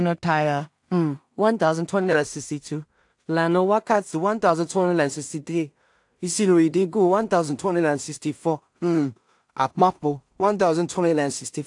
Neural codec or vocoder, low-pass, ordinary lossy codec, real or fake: codec, 16 kHz in and 24 kHz out, 0.4 kbps, LongCat-Audio-Codec, two codebook decoder; 10.8 kHz; AAC, 64 kbps; fake